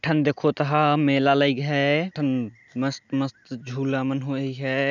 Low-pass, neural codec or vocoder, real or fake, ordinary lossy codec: 7.2 kHz; none; real; none